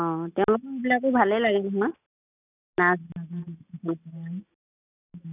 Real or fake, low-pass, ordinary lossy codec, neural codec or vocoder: real; 3.6 kHz; none; none